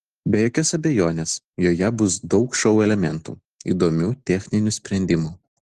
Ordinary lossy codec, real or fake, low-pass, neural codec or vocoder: Opus, 16 kbps; real; 10.8 kHz; none